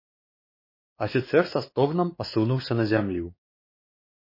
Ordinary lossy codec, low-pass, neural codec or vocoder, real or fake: MP3, 24 kbps; 5.4 kHz; codec, 16 kHz, 2 kbps, X-Codec, WavLM features, trained on Multilingual LibriSpeech; fake